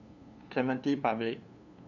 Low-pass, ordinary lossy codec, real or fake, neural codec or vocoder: 7.2 kHz; none; fake; codec, 16 kHz, 2 kbps, FunCodec, trained on LibriTTS, 25 frames a second